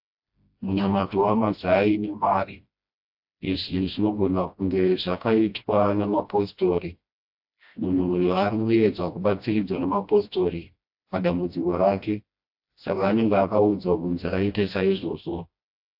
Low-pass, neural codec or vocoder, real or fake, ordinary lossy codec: 5.4 kHz; codec, 16 kHz, 1 kbps, FreqCodec, smaller model; fake; AAC, 48 kbps